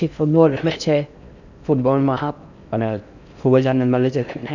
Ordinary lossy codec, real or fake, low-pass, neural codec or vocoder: none; fake; 7.2 kHz; codec, 16 kHz in and 24 kHz out, 0.6 kbps, FocalCodec, streaming, 4096 codes